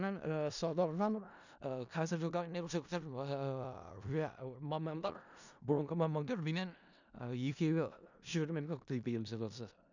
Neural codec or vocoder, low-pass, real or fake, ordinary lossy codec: codec, 16 kHz in and 24 kHz out, 0.4 kbps, LongCat-Audio-Codec, four codebook decoder; 7.2 kHz; fake; none